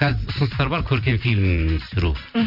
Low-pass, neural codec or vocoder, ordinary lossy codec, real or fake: 5.4 kHz; vocoder, 44.1 kHz, 128 mel bands every 512 samples, BigVGAN v2; none; fake